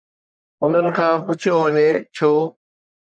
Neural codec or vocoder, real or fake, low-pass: codec, 44.1 kHz, 1.7 kbps, Pupu-Codec; fake; 9.9 kHz